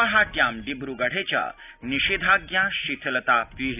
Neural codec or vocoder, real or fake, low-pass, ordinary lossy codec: none; real; 3.6 kHz; none